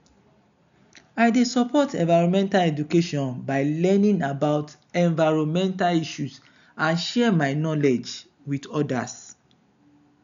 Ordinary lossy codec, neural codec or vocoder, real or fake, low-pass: none; none; real; 7.2 kHz